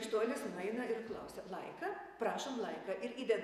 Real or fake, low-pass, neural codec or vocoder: fake; 14.4 kHz; vocoder, 48 kHz, 128 mel bands, Vocos